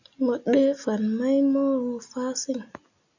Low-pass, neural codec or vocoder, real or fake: 7.2 kHz; none; real